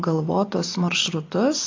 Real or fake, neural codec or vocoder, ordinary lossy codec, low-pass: real; none; AAC, 32 kbps; 7.2 kHz